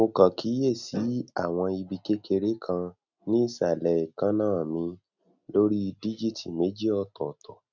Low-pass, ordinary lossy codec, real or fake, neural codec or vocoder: 7.2 kHz; none; real; none